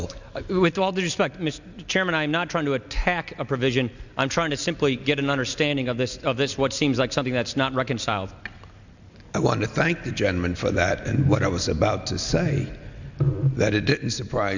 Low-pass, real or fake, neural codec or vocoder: 7.2 kHz; real; none